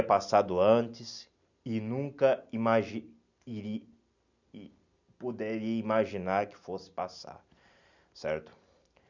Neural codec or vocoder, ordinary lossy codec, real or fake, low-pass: none; none; real; 7.2 kHz